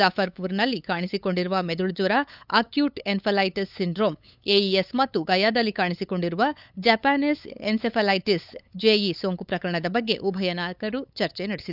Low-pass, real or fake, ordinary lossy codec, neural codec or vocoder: 5.4 kHz; fake; none; codec, 16 kHz, 8 kbps, FunCodec, trained on LibriTTS, 25 frames a second